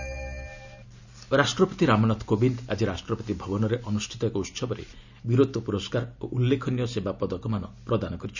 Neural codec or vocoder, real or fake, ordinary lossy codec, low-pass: none; real; none; 7.2 kHz